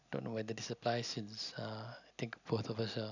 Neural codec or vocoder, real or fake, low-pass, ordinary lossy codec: none; real; 7.2 kHz; none